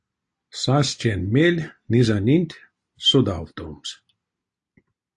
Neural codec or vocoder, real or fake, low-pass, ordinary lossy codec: none; real; 10.8 kHz; AAC, 64 kbps